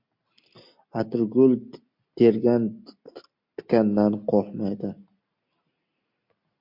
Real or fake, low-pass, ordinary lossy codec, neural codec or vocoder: real; 5.4 kHz; MP3, 48 kbps; none